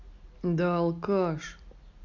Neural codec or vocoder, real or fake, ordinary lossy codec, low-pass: none; real; none; 7.2 kHz